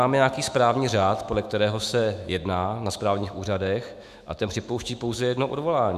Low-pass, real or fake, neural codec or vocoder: 14.4 kHz; fake; autoencoder, 48 kHz, 128 numbers a frame, DAC-VAE, trained on Japanese speech